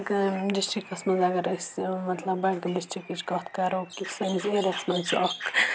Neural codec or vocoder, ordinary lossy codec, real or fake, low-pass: none; none; real; none